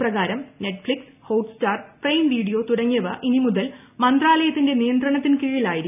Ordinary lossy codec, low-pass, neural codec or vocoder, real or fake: none; 3.6 kHz; none; real